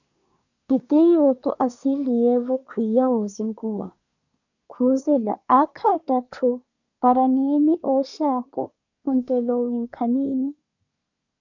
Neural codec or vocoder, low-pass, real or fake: codec, 24 kHz, 1 kbps, SNAC; 7.2 kHz; fake